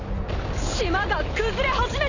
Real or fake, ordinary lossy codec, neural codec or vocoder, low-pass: real; none; none; 7.2 kHz